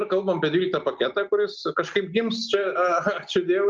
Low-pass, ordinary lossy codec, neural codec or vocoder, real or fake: 7.2 kHz; Opus, 32 kbps; none; real